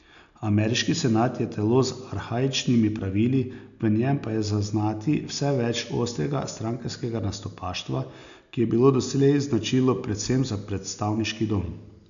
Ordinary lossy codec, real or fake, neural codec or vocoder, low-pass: none; real; none; 7.2 kHz